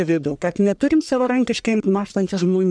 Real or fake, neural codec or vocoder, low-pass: fake; codec, 44.1 kHz, 1.7 kbps, Pupu-Codec; 9.9 kHz